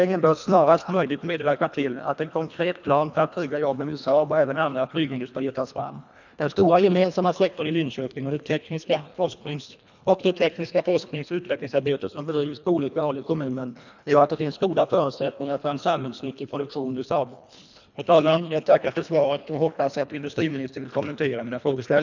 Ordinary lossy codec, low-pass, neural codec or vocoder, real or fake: none; 7.2 kHz; codec, 24 kHz, 1.5 kbps, HILCodec; fake